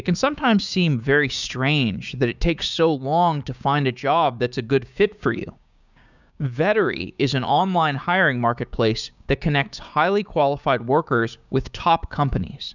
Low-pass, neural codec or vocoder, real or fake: 7.2 kHz; codec, 16 kHz, 6 kbps, DAC; fake